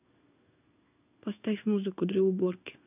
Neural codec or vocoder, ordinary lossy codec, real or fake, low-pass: codec, 16 kHz in and 24 kHz out, 1 kbps, XY-Tokenizer; none; fake; 3.6 kHz